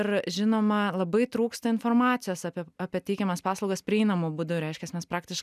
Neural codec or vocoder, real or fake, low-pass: none; real; 14.4 kHz